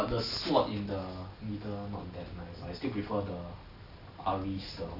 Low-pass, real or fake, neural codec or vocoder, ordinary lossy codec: 5.4 kHz; real; none; none